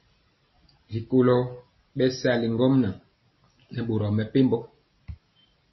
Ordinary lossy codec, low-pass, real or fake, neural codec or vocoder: MP3, 24 kbps; 7.2 kHz; real; none